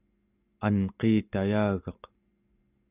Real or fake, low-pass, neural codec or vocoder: real; 3.6 kHz; none